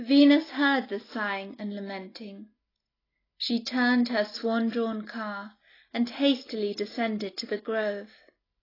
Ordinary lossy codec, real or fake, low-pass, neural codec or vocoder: AAC, 24 kbps; real; 5.4 kHz; none